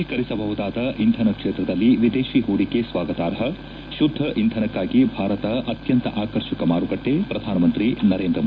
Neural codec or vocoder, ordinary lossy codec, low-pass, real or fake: none; none; none; real